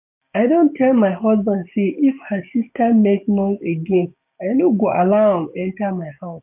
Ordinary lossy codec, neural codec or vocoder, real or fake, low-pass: AAC, 32 kbps; codec, 16 kHz, 6 kbps, DAC; fake; 3.6 kHz